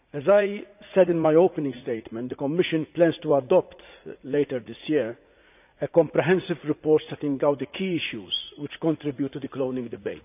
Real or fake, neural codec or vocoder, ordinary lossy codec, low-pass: fake; vocoder, 44.1 kHz, 80 mel bands, Vocos; none; 3.6 kHz